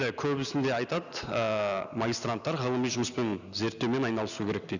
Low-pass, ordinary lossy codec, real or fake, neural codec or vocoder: 7.2 kHz; none; real; none